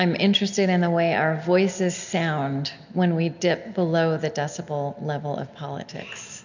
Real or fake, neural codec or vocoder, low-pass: real; none; 7.2 kHz